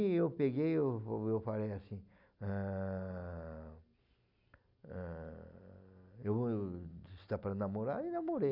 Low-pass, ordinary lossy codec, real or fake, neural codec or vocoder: 5.4 kHz; none; real; none